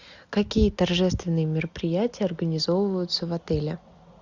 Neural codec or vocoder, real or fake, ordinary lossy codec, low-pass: none; real; Opus, 64 kbps; 7.2 kHz